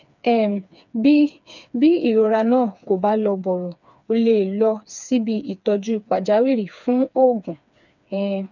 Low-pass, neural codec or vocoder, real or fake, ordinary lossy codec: 7.2 kHz; codec, 16 kHz, 4 kbps, FreqCodec, smaller model; fake; none